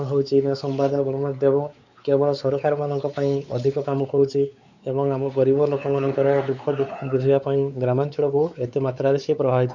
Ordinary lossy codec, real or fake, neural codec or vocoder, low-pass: none; fake; codec, 16 kHz, 4 kbps, X-Codec, WavLM features, trained on Multilingual LibriSpeech; 7.2 kHz